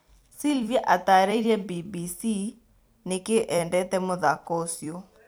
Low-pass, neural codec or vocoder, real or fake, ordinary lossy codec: none; vocoder, 44.1 kHz, 128 mel bands every 256 samples, BigVGAN v2; fake; none